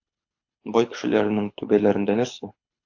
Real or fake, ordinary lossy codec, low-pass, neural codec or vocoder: fake; AAC, 48 kbps; 7.2 kHz; codec, 24 kHz, 6 kbps, HILCodec